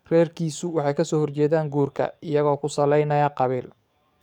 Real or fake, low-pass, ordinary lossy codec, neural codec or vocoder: fake; 19.8 kHz; none; codec, 44.1 kHz, 7.8 kbps, DAC